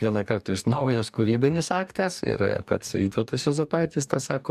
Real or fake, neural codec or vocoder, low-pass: fake; codec, 44.1 kHz, 2.6 kbps, DAC; 14.4 kHz